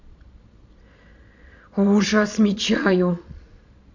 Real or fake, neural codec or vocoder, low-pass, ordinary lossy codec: fake; vocoder, 22.05 kHz, 80 mel bands, Vocos; 7.2 kHz; none